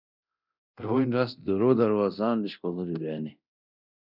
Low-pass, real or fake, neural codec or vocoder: 5.4 kHz; fake; codec, 24 kHz, 0.9 kbps, DualCodec